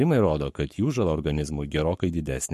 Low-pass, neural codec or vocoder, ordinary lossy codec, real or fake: 14.4 kHz; codec, 44.1 kHz, 7.8 kbps, Pupu-Codec; MP3, 64 kbps; fake